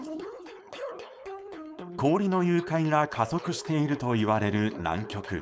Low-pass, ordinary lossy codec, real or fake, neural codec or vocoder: none; none; fake; codec, 16 kHz, 4.8 kbps, FACodec